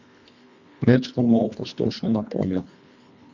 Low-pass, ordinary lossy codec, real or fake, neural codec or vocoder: 7.2 kHz; none; fake; codec, 24 kHz, 1.5 kbps, HILCodec